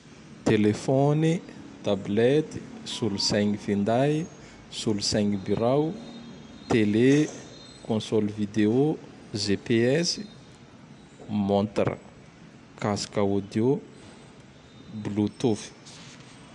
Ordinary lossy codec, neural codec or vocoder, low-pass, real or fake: none; none; 10.8 kHz; real